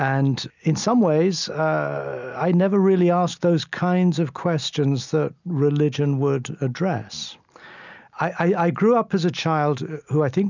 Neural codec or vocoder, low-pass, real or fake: none; 7.2 kHz; real